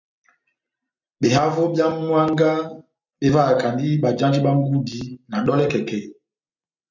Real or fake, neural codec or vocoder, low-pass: real; none; 7.2 kHz